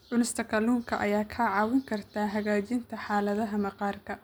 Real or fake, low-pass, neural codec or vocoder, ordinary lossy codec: real; none; none; none